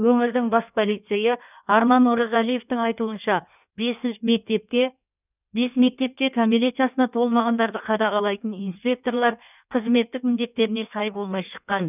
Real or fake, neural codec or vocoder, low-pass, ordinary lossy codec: fake; codec, 16 kHz in and 24 kHz out, 1.1 kbps, FireRedTTS-2 codec; 3.6 kHz; none